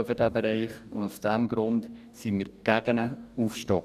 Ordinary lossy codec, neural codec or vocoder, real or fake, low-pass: none; codec, 44.1 kHz, 2.6 kbps, DAC; fake; 14.4 kHz